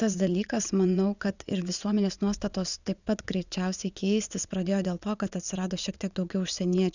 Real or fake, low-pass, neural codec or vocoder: fake; 7.2 kHz; vocoder, 22.05 kHz, 80 mel bands, WaveNeXt